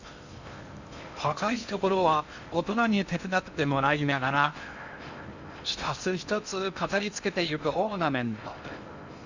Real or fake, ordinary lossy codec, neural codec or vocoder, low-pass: fake; Opus, 64 kbps; codec, 16 kHz in and 24 kHz out, 0.6 kbps, FocalCodec, streaming, 2048 codes; 7.2 kHz